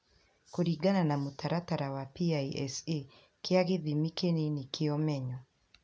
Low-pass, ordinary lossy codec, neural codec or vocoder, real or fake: none; none; none; real